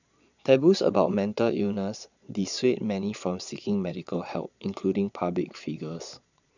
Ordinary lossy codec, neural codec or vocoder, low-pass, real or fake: none; vocoder, 22.05 kHz, 80 mel bands, Vocos; 7.2 kHz; fake